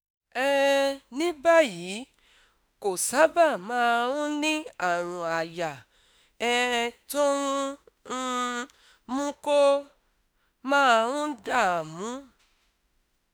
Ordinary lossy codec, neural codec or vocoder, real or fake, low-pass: none; autoencoder, 48 kHz, 32 numbers a frame, DAC-VAE, trained on Japanese speech; fake; none